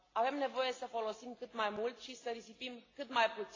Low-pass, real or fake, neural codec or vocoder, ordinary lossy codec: 7.2 kHz; real; none; AAC, 32 kbps